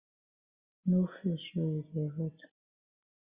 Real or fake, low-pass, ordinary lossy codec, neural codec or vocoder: real; 3.6 kHz; Opus, 64 kbps; none